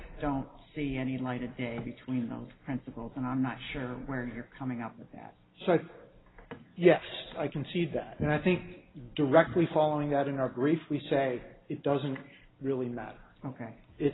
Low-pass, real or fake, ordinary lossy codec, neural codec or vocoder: 7.2 kHz; real; AAC, 16 kbps; none